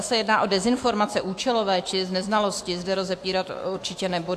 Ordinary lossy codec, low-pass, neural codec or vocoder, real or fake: AAC, 64 kbps; 14.4 kHz; autoencoder, 48 kHz, 128 numbers a frame, DAC-VAE, trained on Japanese speech; fake